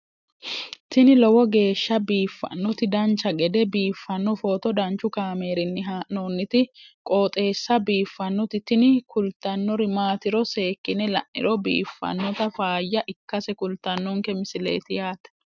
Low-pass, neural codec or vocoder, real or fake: 7.2 kHz; none; real